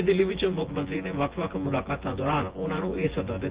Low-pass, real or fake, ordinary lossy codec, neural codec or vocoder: 3.6 kHz; fake; Opus, 16 kbps; vocoder, 24 kHz, 100 mel bands, Vocos